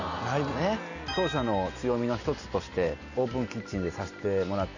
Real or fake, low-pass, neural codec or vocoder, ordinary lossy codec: real; 7.2 kHz; none; none